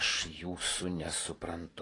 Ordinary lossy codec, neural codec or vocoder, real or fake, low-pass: AAC, 32 kbps; vocoder, 44.1 kHz, 128 mel bands every 512 samples, BigVGAN v2; fake; 10.8 kHz